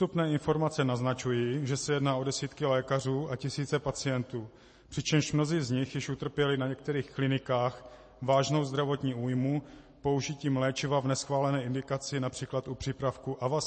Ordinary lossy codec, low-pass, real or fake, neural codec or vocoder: MP3, 32 kbps; 10.8 kHz; real; none